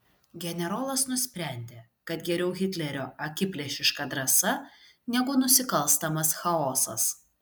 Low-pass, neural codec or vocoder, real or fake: 19.8 kHz; none; real